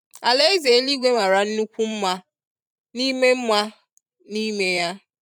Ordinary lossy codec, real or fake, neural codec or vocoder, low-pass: none; fake; vocoder, 44.1 kHz, 128 mel bands every 512 samples, BigVGAN v2; 19.8 kHz